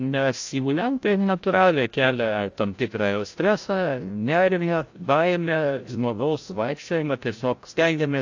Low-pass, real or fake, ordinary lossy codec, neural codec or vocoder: 7.2 kHz; fake; AAC, 48 kbps; codec, 16 kHz, 0.5 kbps, FreqCodec, larger model